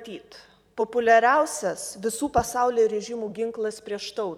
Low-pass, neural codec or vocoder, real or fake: 19.8 kHz; vocoder, 44.1 kHz, 128 mel bands every 512 samples, BigVGAN v2; fake